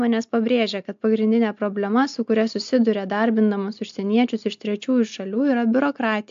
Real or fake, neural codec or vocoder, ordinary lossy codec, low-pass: real; none; AAC, 64 kbps; 7.2 kHz